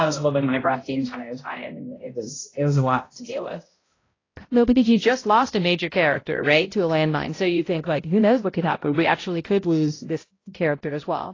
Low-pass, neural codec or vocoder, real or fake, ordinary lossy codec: 7.2 kHz; codec, 16 kHz, 0.5 kbps, X-Codec, HuBERT features, trained on balanced general audio; fake; AAC, 32 kbps